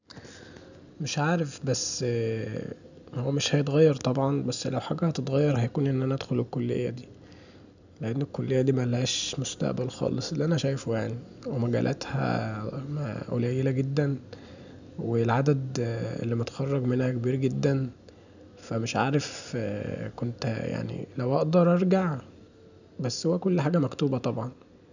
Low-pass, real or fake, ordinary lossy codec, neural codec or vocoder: 7.2 kHz; real; none; none